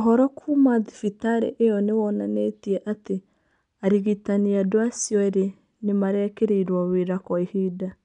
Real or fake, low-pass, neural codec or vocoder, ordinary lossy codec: real; 10.8 kHz; none; none